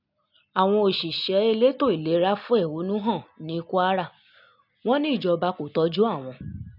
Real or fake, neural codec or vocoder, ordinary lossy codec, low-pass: real; none; none; 5.4 kHz